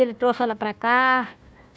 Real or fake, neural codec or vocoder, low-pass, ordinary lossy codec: fake; codec, 16 kHz, 1 kbps, FunCodec, trained on Chinese and English, 50 frames a second; none; none